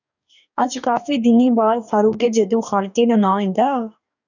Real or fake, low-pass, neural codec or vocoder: fake; 7.2 kHz; codec, 44.1 kHz, 2.6 kbps, DAC